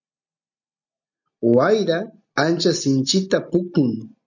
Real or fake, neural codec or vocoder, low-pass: real; none; 7.2 kHz